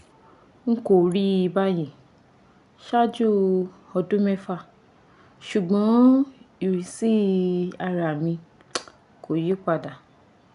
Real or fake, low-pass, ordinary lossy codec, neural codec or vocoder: real; 10.8 kHz; none; none